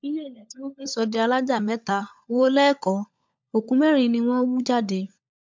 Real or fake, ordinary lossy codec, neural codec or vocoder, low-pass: fake; MP3, 64 kbps; codec, 16 kHz, 16 kbps, FunCodec, trained on LibriTTS, 50 frames a second; 7.2 kHz